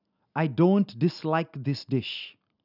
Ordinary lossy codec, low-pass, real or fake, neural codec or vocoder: none; 5.4 kHz; real; none